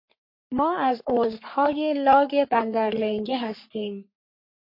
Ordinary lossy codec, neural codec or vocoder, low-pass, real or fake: MP3, 32 kbps; codec, 44.1 kHz, 3.4 kbps, Pupu-Codec; 5.4 kHz; fake